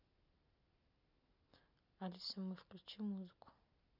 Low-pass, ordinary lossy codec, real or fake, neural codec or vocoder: 5.4 kHz; none; real; none